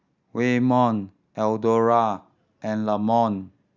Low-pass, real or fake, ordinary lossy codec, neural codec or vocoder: 7.2 kHz; real; Opus, 64 kbps; none